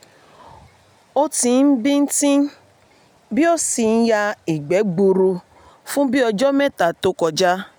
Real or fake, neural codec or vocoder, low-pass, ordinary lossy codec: real; none; none; none